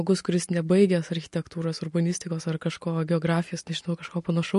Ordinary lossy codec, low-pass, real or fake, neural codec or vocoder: MP3, 48 kbps; 14.4 kHz; real; none